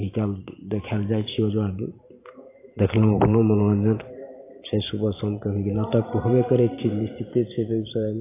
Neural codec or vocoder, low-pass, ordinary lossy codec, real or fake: vocoder, 44.1 kHz, 128 mel bands every 512 samples, BigVGAN v2; 3.6 kHz; AAC, 24 kbps; fake